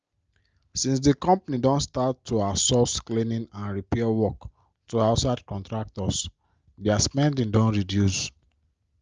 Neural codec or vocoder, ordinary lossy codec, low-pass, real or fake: none; Opus, 24 kbps; 7.2 kHz; real